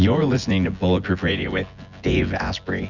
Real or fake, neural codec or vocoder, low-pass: fake; vocoder, 24 kHz, 100 mel bands, Vocos; 7.2 kHz